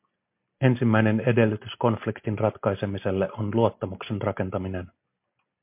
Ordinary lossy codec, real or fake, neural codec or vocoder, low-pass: MP3, 32 kbps; real; none; 3.6 kHz